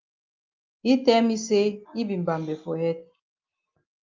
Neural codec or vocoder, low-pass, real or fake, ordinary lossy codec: none; 7.2 kHz; real; Opus, 24 kbps